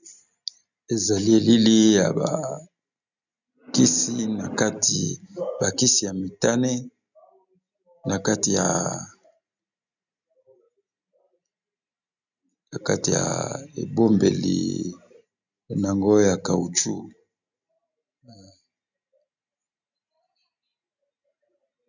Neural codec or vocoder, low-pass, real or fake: none; 7.2 kHz; real